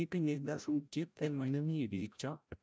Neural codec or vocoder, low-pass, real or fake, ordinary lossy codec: codec, 16 kHz, 0.5 kbps, FreqCodec, larger model; none; fake; none